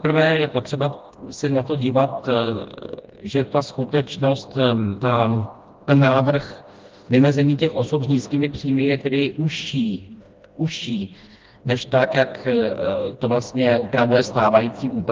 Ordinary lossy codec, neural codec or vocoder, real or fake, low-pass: Opus, 24 kbps; codec, 16 kHz, 1 kbps, FreqCodec, smaller model; fake; 7.2 kHz